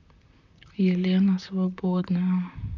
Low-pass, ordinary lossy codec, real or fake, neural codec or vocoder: 7.2 kHz; none; fake; vocoder, 44.1 kHz, 128 mel bands, Pupu-Vocoder